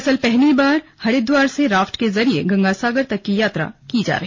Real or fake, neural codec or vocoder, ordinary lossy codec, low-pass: real; none; MP3, 48 kbps; 7.2 kHz